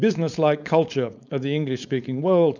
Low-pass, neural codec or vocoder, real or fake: 7.2 kHz; codec, 16 kHz, 4.8 kbps, FACodec; fake